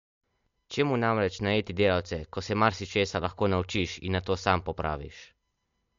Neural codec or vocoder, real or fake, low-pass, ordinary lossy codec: none; real; 7.2 kHz; MP3, 48 kbps